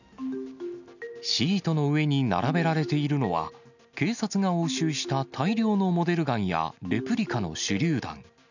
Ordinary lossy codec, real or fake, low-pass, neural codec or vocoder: none; real; 7.2 kHz; none